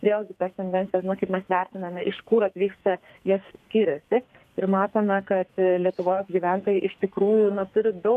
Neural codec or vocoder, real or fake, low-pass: codec, 44.1 kHz, 2.6 kbps, SNAC; fake; 14.4 kHz